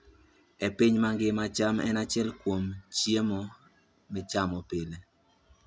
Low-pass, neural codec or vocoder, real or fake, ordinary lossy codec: none; none; real; none